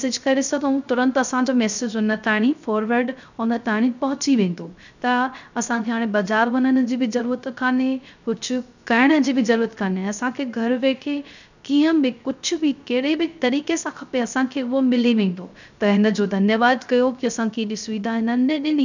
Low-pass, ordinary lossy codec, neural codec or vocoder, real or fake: 7.2 kHz; none; codec, 16 kHz, 0.3 kbps, FocalCodec; fake